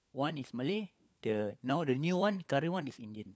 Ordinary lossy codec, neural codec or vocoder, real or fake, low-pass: none; codec, 16 kHz, 4 kbps, FunCodec, trained on LibriTTS, 50 frames a second; fake; none